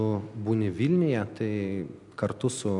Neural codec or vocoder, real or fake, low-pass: none; real; 10.8 kHz